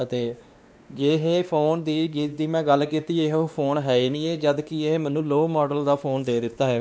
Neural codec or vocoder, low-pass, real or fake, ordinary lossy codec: codec, 16 kHz, 2 kbps, X-Codec, WavLM features, trained on Multilingual LibriSpeech; none; fake; none